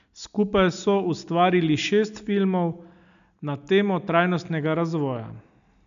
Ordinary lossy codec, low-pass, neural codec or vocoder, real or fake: none; 7.2 kHz; none; real